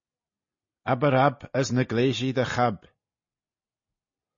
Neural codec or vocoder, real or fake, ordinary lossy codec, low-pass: none; real; MP3, 32 kbps; 7.2 kHz